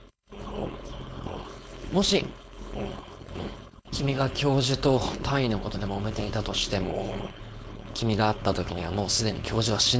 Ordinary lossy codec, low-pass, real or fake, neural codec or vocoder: none; none; fake; codec, 16 kHz, 4.8 kbps, FACodec